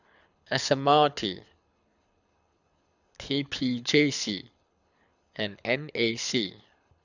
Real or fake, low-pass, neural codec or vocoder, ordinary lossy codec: fake; 7.2 kHz; codec, 24 kHz, 6 kbps, HILCodec; none